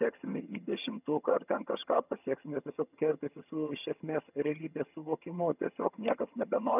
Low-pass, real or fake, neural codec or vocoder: 3.6 kHz; fake; vocoder, 22.05 kHz, 80 mel bands, HiFi-GAN